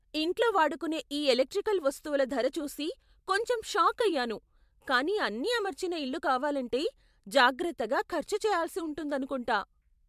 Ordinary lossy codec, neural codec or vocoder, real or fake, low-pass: MP3, 96 kbps; vocoder, 44.1 kHz, 128 mel bands every 512 samples, BigVGAN v2; fake; 14.4 kHz